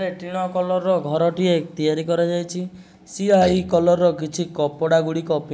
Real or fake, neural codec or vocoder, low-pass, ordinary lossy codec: real; none; none; none